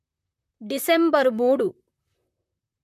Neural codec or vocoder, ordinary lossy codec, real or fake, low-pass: vocoder, 44.1 kHz, 128 mel bands, Pupu-Vocoder; MP3, 64 kbps; fake; 14.4 kHz